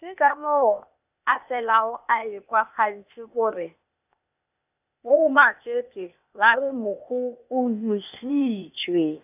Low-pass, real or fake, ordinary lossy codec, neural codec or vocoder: 3.6 kHz; fake; none; codec, 16 kHz, 0.8 kbps, ZipCodec